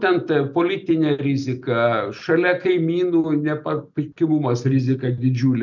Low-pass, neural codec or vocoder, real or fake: 7.2 kHz; none; real